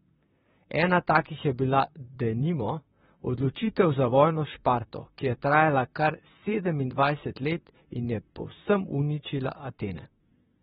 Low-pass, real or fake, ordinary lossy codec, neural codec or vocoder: 19.8 kHz; fake; AAC, 16 kbps; vocoder, 44.1 kHz, 128 mel bands, Pupu-Vocoder